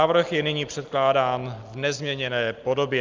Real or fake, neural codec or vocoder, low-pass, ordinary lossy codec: real; none; 7.2 kHz; Opus, 24 kbps